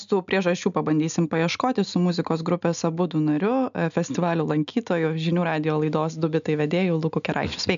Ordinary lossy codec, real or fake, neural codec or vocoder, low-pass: AAC, 96 kbps; real; none; 7.2 kHz